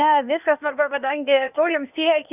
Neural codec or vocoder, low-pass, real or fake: codec, 16 kHz, 0.8 kbps, ZipCodec; 3.6 kHz; fake